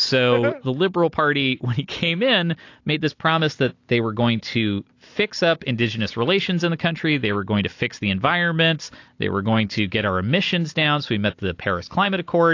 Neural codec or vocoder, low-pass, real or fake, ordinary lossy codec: none; 7.2 kHz; real; AAC, 48 kbps